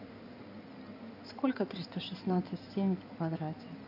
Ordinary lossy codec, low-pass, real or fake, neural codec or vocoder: none; 5.4 kHz; fake; codec, 16 kHz in and 24 kHz out, 2.2 kbps, FireRedTTS-2 codec